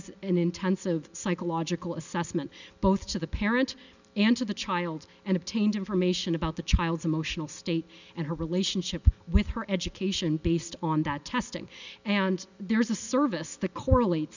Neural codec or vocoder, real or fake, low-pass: none; real; 7.2 kHz